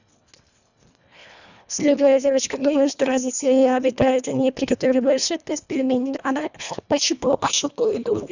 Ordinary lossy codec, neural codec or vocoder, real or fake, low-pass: none; codec, 24 kHz, 1.5 kbps, HILCodec; fake; 7.2 kHz